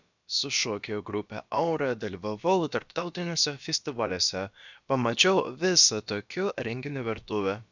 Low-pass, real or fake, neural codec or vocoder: 7.2 kHz; fake; codec, 16 kHz, about 1 kbps, DyCAST, with the encoder's durations